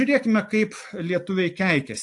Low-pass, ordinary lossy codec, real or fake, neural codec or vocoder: 10.8 kHz; AAC, 64 kbps; real; none